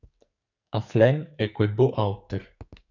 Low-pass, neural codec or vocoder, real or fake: 7.2 kHz; codec, 44.1 kHz, 2.6 kbps, SNAC; fake